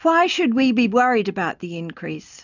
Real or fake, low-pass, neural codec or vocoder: real; 7.2 kHz; none